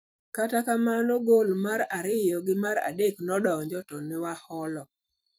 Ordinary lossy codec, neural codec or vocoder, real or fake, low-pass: none; vocoder, 44.1 kHz, 128 mel bands every 256 samples, BigVGAN v2; fake; none